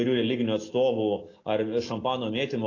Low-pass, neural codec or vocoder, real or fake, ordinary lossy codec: 7.2 kHz; none; real; AAC, 32 kbps